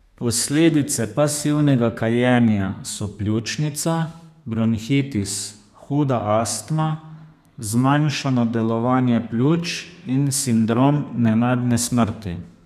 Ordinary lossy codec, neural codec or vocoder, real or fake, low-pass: none; codec, 32 kHz, 1.9 kbps, SNAC; fake; 14.4 kHz